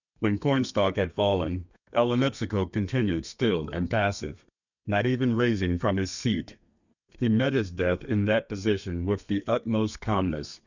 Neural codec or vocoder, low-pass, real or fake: codec, 32 kHz, 1.9 kbps, SNAC; 7.2 kHz; fake